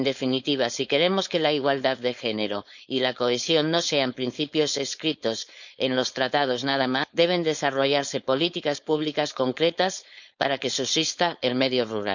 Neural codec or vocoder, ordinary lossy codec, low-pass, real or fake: codec, 16 kHz, 4.8 kbps, FACodec; none; 7.2 kHz; fake